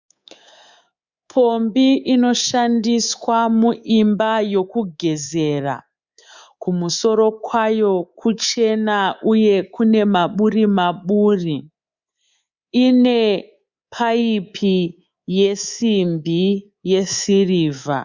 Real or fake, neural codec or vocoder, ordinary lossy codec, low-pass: fake; codec, 24 kHz, 3.1 kbps, DualCodec; Opus, 64 kbps; 7.2 kHz